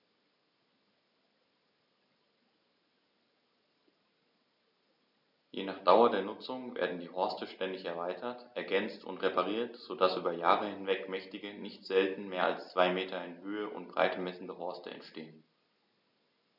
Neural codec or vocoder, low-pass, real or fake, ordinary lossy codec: none; 5.4 kHz; real; none